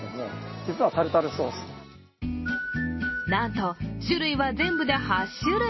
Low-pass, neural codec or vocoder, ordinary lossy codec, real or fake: 7.2 kHz; none; MP3, 24 kbps; real